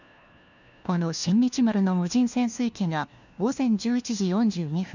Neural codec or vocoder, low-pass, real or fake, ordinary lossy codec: codec, 16 kHz, 1 kbps, FunCodec, trained on LibriTTS, 50 frames a second; 7.2 kHz; fake; none